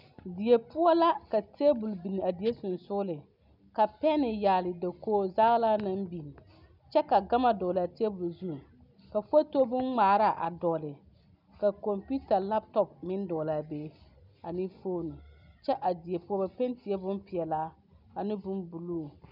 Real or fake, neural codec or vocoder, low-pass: real; none; 5.4 kHz